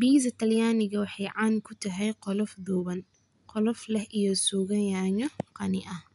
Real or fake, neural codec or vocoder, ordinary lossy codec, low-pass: real; none; none; 10.8 kHz